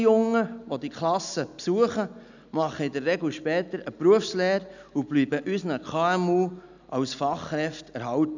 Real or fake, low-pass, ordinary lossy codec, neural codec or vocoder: real; 7.2 kHz; none; none